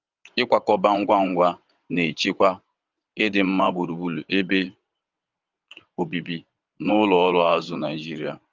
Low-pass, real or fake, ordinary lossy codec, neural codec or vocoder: 7.2 kHz; fake; Opus, 24 kbps; vocoder, 22.05 kHz, 80 mel bands, WaveNeXt